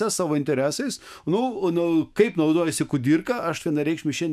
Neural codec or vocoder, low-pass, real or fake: autoencoder, 48 kHz, 128 numbers a frame, DAC-VAE, trained on Japanese speech; 14.4 kHz; fake